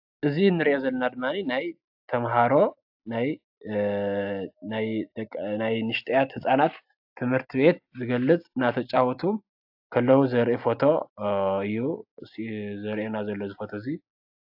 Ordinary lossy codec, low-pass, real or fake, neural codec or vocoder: AAC, 48 kbps; 5.4 kHz; real; none